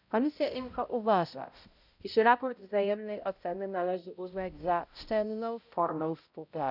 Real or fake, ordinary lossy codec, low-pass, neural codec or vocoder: fake; none; 5.4 kHz; codec, 16 kHz, 0.5 kbps, X-Codec, HuBERT features, trained on balanced general audio